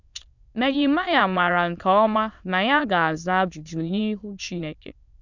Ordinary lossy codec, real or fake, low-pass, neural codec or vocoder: none; fake; 7.2 kHz; autoencoder, 22.05 kHz, a latent of 192 numbers a frame, VITS, trained on many speakers